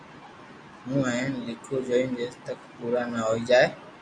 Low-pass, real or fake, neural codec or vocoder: 9.9 kHz; real; none